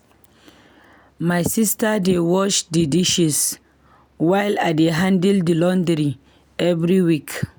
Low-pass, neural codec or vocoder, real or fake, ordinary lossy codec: none; none; real; none